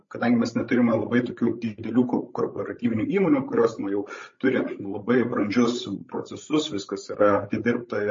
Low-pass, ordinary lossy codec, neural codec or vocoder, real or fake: 7.2 kHz; MP3, 32 kbps; codec, 16 kHz, 16 kbps, FreqCodec, larger model; fake